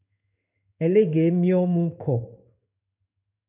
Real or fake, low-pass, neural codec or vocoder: fake; 3.6 kHz; codec, 16 kHz in and 24 kHz out, 1 kbps, XY-Tokenizer